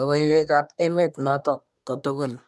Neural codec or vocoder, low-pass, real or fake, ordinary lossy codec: codec, 24 kHz, 1 kbps, SNAC; none; fake; none